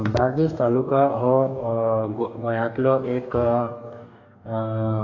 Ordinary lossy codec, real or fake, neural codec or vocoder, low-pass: AAC, 48 kbps; fake; codec, 44.1 kHz, 2.6 kbps, DAC; 7.2 kHz